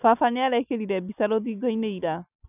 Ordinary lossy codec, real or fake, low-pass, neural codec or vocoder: none; real; 3.6 kHz; none